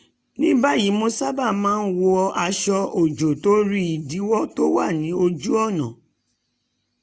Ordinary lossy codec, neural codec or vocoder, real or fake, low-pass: none; none; real; none